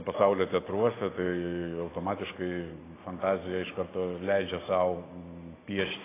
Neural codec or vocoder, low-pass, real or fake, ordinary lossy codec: none; 3.6 kHz; real; AAC, 16 kbps